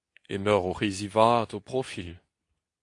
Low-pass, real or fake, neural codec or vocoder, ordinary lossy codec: 10.8 kHz; fake; codec, 24 kHz, 0.9 kbps, WavTokenizer, medium speech release version 2; AAC, 64 kbps